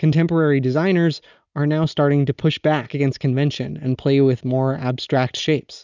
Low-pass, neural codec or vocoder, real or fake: 7.2 kHz; none; real